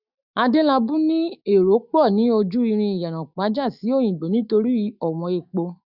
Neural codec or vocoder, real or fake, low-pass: autoencoder, 48 kHz, 128 numbers a frame, DAC-VAE, trained on Japanese speech; fake; 5.4 kHz